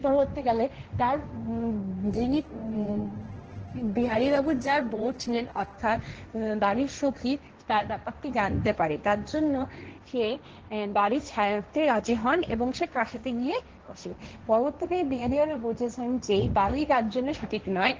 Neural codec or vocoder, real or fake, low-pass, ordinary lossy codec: codec, 16 kHz, 1.1 kbps, Voila-Tokenizer; fake; 7.2 kHz; Opus, 16 kbps